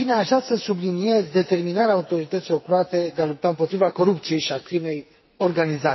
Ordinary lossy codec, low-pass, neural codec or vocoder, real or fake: MP3, 24 kbps; 7.2 kHz; codec, 44.1 kHz, 2.6 kbps, SNAC; fake